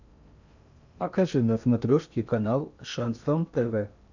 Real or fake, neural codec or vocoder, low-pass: fake; codec, 16 kHz in and 24 kHz out, 0.6 kbps, FocalCodec, streaming, 2048 codes; 7.2 kHz